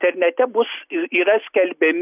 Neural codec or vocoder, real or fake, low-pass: none; real; 3.6 kHz